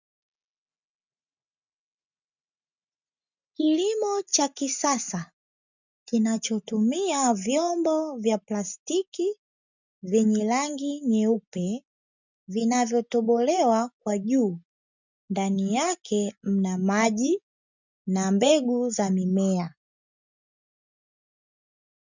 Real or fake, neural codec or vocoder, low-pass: real; none; 7.2 kHz